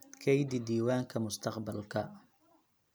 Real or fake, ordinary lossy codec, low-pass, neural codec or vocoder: real; none; none; none